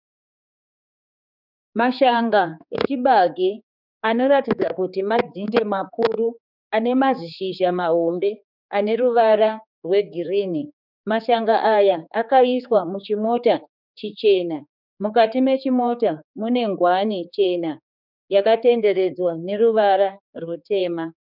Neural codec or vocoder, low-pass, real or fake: codec, 16 kHz, 4 kbps, X-Codec, HuBERT features, trained on general audio; 5.4 kHz; fake